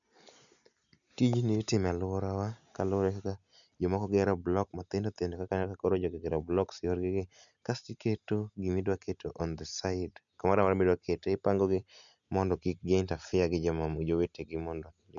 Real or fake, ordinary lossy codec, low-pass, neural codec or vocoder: real; none; 7.2 kHz; none